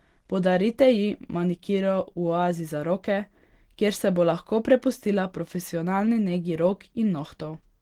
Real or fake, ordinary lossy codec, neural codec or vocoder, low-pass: real; Opus, 16 kbps; none; 19.8 kHz